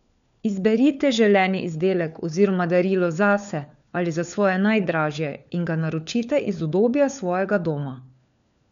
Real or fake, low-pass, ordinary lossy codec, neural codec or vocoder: fake; 7.2 kHz; none; codec, 16 kHz, 4 kbps, FunCodec, trained on LibriTTS, 50 frames a second